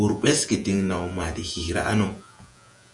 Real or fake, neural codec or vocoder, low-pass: fake; vocoder, 48 kHz, 128 mel bands, Vocos; 10.8 kHz